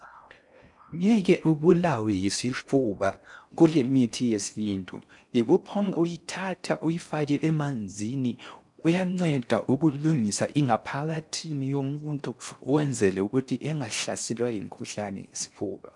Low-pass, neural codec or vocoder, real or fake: 10.8 kHz; codec, 16 kHz in and 24 kHz out, 0.6 kbps, FocalCodec, streaming, 4096 codes; fake